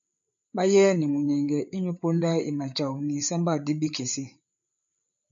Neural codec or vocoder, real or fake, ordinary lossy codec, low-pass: codec, 16 kHz, 8 kbps, FreqCodec, larger model; fake; AAC, 64 kbps; 7.2 kHz